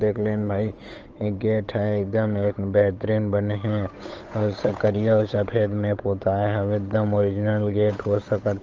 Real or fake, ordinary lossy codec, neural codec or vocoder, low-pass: fake; Opus, 24 kbps; codec, 16 kHz, 8 kbps, FunCodec, trained on Chinese and English, 25 frames a second; 7.2 kHz